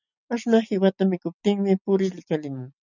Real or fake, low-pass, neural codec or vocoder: real; 7.2 kHz; none